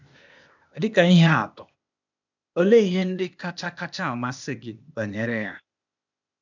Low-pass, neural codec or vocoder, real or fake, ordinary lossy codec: 7.2 kHz; codec, 16 kHz, 0.8 kbps, ZipCodec; fake; none